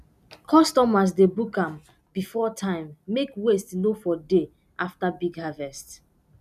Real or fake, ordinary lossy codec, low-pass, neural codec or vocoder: real; none; 14.4 kHz; none